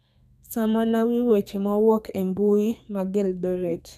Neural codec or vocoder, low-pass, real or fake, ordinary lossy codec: codec, 32 kHz, 1.9 kbps, SNAC; 14.4 kHz; fake; none